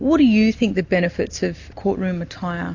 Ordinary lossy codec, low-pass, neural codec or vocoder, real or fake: AAC, 48 kbps; 7.2 kHz; none; real